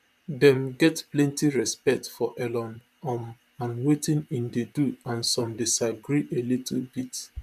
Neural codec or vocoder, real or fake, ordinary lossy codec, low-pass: vocoder, 44.1 kHz, 128 mel bands, Pupu-Vocoder; fake; none; 14.4 kHz